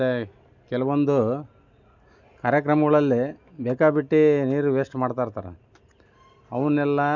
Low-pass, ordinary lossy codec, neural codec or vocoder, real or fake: 7.2 kHz; none; none; real